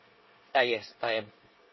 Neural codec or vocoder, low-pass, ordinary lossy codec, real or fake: codec, 24 kHz, 1 kbps, SNAC; 7.2 kHz; MP3, 24 kbps; fake